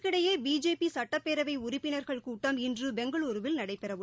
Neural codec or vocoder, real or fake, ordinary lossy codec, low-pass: none; real; none; none